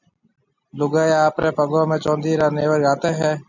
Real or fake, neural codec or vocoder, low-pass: real; none; 7.2 kHz